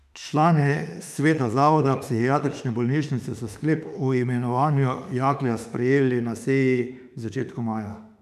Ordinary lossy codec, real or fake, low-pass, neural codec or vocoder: none; fake; 14.4 kHz; autoencoder, 48 kHz, 32 numbers a frame, DAC-VAE, trained on Japanese speech